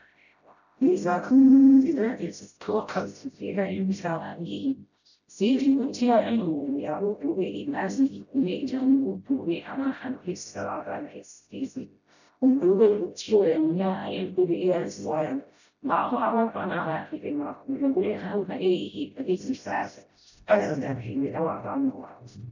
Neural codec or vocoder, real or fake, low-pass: codec, 16 kHz, 0.5 kbps, FreqCodec, smaller model; fake; 7.2 kHz